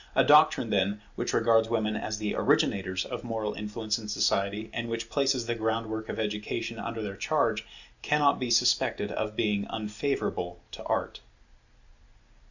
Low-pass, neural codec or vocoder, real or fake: 7.2 kHz; none; real